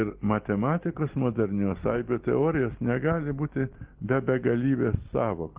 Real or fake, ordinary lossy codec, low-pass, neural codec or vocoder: real; Opus, 16 kbps; 3.6 kHz; none